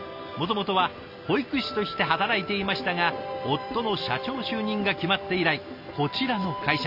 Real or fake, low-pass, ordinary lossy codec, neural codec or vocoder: real; 5.4 kHz; none; none